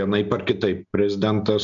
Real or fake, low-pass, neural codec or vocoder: real; 7.2 kHz; none